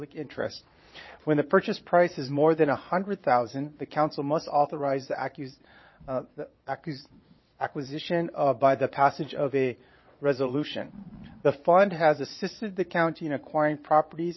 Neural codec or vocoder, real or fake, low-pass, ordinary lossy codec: vocoder, 22.05 kHz, 80 mel bands, Vocos; fake; 7.2 kHz; MP3, 24 kbps